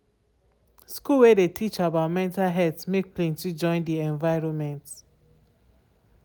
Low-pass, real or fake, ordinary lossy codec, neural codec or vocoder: none; real; none; none